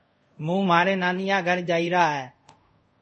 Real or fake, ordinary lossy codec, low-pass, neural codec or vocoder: fake; MP3, 32 kbps; 10.8 kHz; codec, 24 kHz, 0.5 kbps, DualCodec